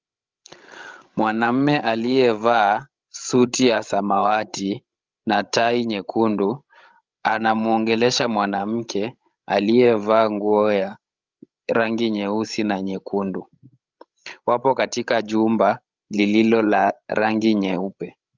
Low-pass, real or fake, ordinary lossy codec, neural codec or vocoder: 7.2 kHz; fake; Opus, 32 kbps; codec, 16 kHz, 16 kbps, FreqCodec, larger model